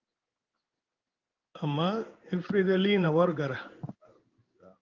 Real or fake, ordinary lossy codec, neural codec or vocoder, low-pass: fake; Opus, 24 kbps; codec, 16 kHz in and 24 kHz out, 1 kbps, XY-Tokenizer; 7.2 kHz